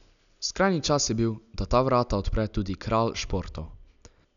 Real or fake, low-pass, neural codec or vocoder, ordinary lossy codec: real; 7.2 kHz; none; none